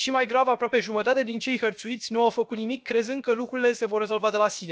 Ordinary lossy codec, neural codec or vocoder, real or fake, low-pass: none; codec, 16 kHz, 0.7 kbps, FocalCodec; fake; none